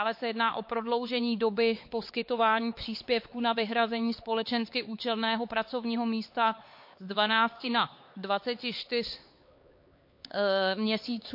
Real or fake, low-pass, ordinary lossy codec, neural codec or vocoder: fake; 5.4 kHz; MP3, 32 kbps; codec, 16 kHz, 4 kbps, X-Codec, HuBERT features, trained on LibriSpeech